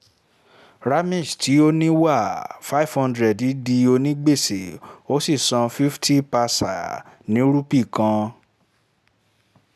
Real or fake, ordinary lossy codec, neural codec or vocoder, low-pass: real; none; none; 14.4 kHz